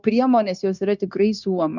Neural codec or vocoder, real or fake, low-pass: codec, 16 kHz, 0.9 kbps, LongCat-Audio-Codec; fake; 7.2 kHz